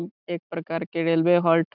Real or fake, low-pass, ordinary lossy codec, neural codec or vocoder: real; 5.4 kHz; none; none